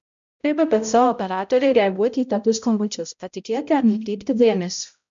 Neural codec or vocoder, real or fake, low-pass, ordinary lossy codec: codec, 16 kHz, 0.5 kbps, X-Codec, HuBERT features, trained on balanced general audio; fake; 7.2 kHz; MP3, 64 kbps